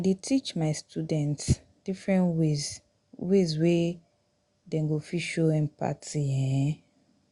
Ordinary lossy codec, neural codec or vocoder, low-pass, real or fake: Opus, 64 kbps; none; 10.8 kHz; real